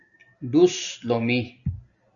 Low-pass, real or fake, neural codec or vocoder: 7.2 kHz; real; none